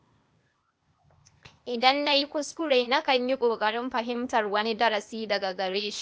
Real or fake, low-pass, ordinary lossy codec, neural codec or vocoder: fake; none; none; codec, 16 kHz, 0.8 kbps, ZipCodec